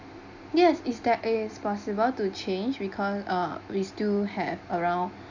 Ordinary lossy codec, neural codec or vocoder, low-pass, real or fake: none; none; 7.2 kHz; real